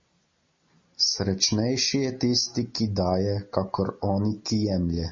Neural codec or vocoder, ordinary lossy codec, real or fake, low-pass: none; MP3, 32 kbps; real; 7.2 kHz